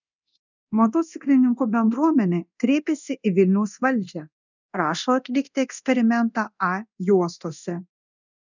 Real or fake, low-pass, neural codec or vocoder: fake; 7.2 kHz; codec, 24 kHz, 0.9 kbps, DualCodec